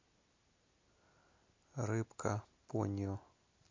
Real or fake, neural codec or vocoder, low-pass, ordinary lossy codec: real; none; 7.2 kHz; MP3, 48 kbps